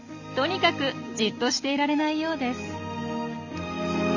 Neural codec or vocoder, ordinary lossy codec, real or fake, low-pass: none; none; real; 7.2 kHz